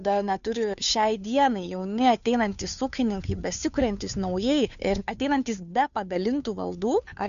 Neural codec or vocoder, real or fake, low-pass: codec, 16 kHz, 2 kbps, FunCodec, trained on Chinese and English, 25 frames a second; fake; 7.2 kHz